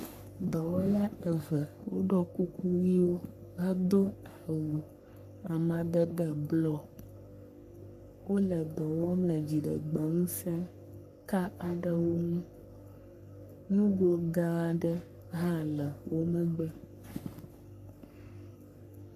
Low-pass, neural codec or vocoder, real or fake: 14.4 kHz; codec, 44.1 kHz, 3.4 kbps, Pupu-Codec; fake